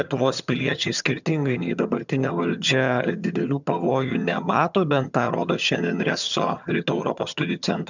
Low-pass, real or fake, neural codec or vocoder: 7.2 kHz; fake; vocoder, 22.05 kHz, 80 mel bands, HiFi-GAN